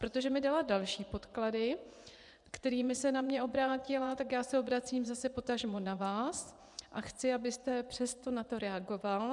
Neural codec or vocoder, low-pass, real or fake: vocoder, 24 kHz, 100 mel bands, Vocos; 10.8 kHz; fake